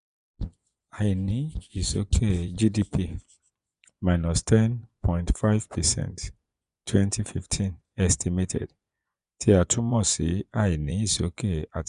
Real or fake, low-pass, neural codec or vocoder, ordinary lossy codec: fake; 10.8 kHz; vocoder, 24 kHz, 100 mel bands, Vocos; none